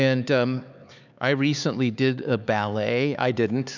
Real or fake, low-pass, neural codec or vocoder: fake; 7.2 kHz; codec, 16 kHz, 4 kbps, X-Codec, HuBERT features, trained on LibriSpeech